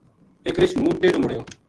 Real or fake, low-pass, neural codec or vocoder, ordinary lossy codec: real; 10.8 kHz; none; Opus, 16 kbps